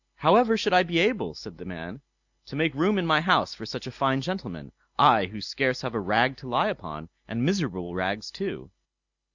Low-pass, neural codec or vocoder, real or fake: 7.2 kHz; none; real